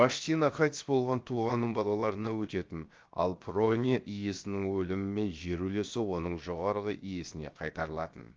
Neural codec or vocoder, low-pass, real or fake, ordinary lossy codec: codec, 16 kHz, 0.7 kbps, FocalCodec; 7.2 kHz; fake; Opus, 24 kbps